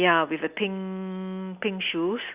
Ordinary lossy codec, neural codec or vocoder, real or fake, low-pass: Opus, 64 kbps; none; real; 3.6 kHz